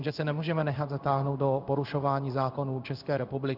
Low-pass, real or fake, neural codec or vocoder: 5.4 kHz; fake; codec, 16 kHz in and 24 kHz out, 1 kbps, XY-Tokenizer